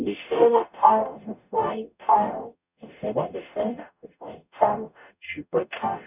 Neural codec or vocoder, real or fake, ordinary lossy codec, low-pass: codec, 44.1 kHz, 0.9 kbps, DAC; fake; none; 3.6 kHz